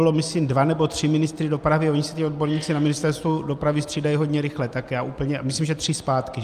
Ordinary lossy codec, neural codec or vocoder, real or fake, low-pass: Opus, 32 kbps; none; real; 14.4 kHz